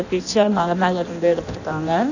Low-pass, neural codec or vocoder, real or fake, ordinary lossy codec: 7.2 kHz; codec, 16 kHz in and 24 kHz out, 0.6 kbps, FireRedTTS-2 codec; fake; none